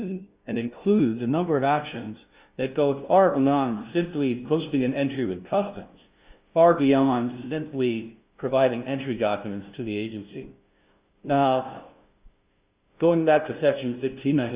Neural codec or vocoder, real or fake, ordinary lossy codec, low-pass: codec, 16 kHz, 0.5 kbps, FunCodec, trained on LibriTTS, 25 frames a second; fake; Opus, 64 kbps; 3.6 kHz